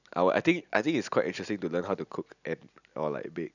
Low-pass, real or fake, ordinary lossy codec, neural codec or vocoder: 7.2 kHz; real; none; none